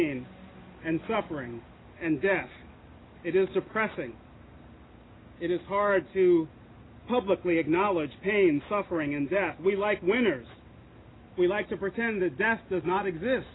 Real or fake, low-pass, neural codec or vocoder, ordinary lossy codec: real; 7.2 kHz; none; AAC, 16 kbps